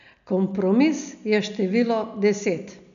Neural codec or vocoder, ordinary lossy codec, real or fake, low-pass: none; none; real; 7.2 kHz